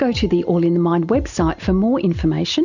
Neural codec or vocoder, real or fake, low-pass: none; real; 7.2 kHz